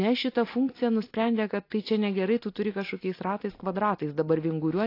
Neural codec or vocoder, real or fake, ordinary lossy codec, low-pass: none; real; AAC, 32 kbps; 5.4 kHz